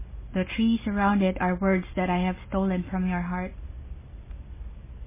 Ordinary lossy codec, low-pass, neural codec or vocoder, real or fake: MP3, 16 kbps; 3.6 kHz; none; real